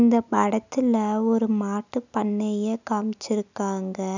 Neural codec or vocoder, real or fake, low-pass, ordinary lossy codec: none; real; 7.2 kHz; none